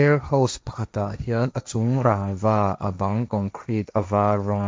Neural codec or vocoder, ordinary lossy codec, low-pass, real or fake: codec, 16 kHz, 1.1 kbps, Voila-Tokenizer; none; none; fake